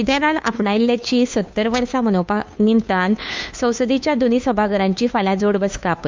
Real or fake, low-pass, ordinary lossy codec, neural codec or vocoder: fake; 7.2 kHz; MP3, 64 kbps; codec, 16 kHz, 2 kbps, FunCodec, trained on Chinese and English, 25 frames a second